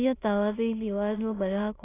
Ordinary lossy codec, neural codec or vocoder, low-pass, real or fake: AAC, 16 kbps; autoencoder, 22.05 kHz, a latent of 192 numbers a frame, VITS, trained on many speakers; 3.6 kHz; fake